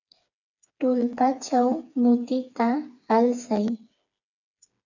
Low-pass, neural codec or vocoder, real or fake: 7.2 kHz; codec, 16 kHz, 4 kbps, FreqCodec, smaller model; fake